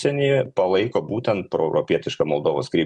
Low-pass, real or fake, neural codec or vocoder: 10.8 kHz; real; none